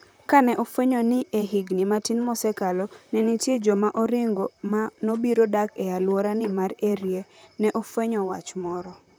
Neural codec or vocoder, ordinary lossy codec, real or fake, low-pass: vocoder, 44.1 kHz, 128 mel bands, Pupu-Vocoder; none; fake; none